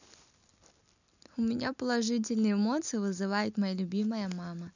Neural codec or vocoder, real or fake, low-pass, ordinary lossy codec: none; real; 7.2 kHz; none